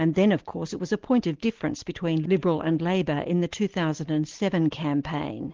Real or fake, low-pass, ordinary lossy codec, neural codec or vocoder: real; 7.2 kHz; Opus, 16 kbps; none